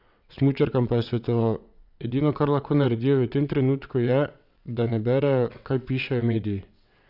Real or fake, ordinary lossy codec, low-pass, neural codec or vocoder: fake; none; 5.4 kHz; vocoder, 22.05 kHz, 80 mel bands, WaveNeXt